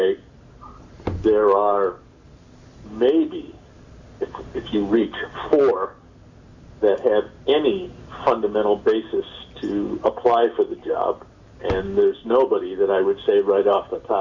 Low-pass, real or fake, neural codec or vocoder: 7.2 kHz; real; none